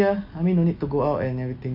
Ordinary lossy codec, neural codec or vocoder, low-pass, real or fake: MP3, 32 kbps; none; 5.4 kHz; real